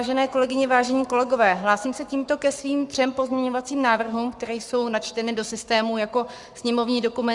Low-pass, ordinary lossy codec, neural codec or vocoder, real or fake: 10.8 kHz; Opus, 64 kbps; codec, 44.1 kHz, 7.8 kbps, Pupu-Codec; fake